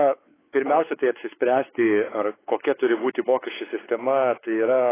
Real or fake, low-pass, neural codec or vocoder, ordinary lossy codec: fake; 3.6 kHz; codec, 16 kHz, 4 kbps, X-Codec, WavLM features, trained on Multilingual LibriSpeech; AAC, 16 kbps